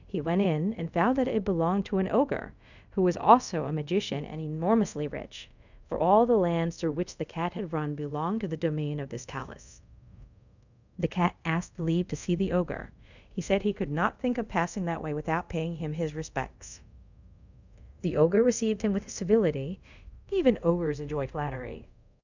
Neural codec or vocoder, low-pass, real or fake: codec, 24 kHz, 0.5 kbps, DualCodec; 7.2 kHz; fake